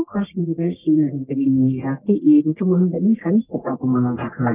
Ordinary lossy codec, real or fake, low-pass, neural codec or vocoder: Opus, 32 kbps; fake; 3.6 kHz; codec, 44.1 kHz, 1.7 kbps, Pupu-Codec